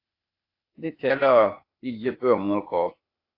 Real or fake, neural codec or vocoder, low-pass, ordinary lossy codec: fake; codec, 16 kHz, 0.8 kbps, ZipCodec; 5.4 kHz; AAC, 32 kbps